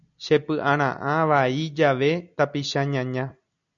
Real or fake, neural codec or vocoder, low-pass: real; none; 7.2 kHz